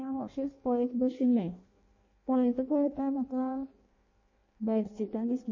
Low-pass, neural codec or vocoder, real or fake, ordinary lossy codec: 7.2 kHz; codec, 16 kHz in and 24 kHz out, 0.6 kbps, FireRedTTS-2 codec; fake; MP3, 32 kbps